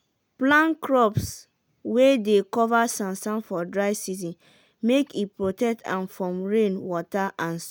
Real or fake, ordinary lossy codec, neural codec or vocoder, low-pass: real; none; none; none